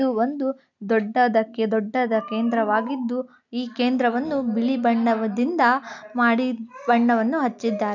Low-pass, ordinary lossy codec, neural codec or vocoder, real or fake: 7.2 kHz; none; none; real